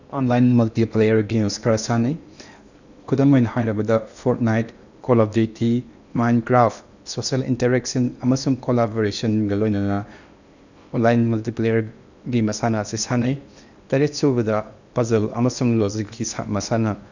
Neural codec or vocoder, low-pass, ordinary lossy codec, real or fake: codec, 16 kHz in and 24 kHz out, 0.8 kbps, FocalCodec, streaming, 65536 codes; 7.2 kHz; none; fake